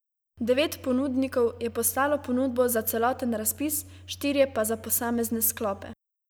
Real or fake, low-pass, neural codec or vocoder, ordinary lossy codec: real; none; none; none